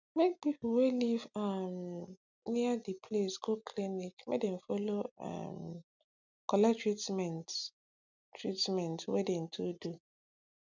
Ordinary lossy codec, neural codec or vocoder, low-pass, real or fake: none; none; 7.2 kHz; real